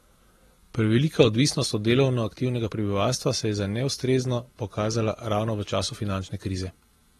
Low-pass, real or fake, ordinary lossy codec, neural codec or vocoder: 19.8 kHz; fake; AAC, 32 kbps; vocoder, 44.1 kHz, 128 mel bands every 512 samples, BigVGAN v2